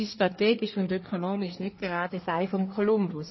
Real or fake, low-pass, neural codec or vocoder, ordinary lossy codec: fake; 7.2 kHz; codec, 24 kHz, 1 kbps, SNAC; MP3, 24 kbps